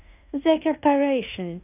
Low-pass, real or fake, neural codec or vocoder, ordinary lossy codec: 3.6 kHz; fake; codec, 16 kHz in and 24 kHz out, 0.9 kbps, LongCat-Audio-Codec, fine tuned four codebook decoder; none